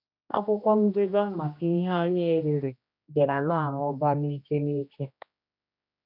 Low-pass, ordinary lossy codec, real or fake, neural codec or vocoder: 5.4 kHz; none; fake; codec, 16 kHz, 1 kbps, X-Codec, HuBERT features, trained on general audio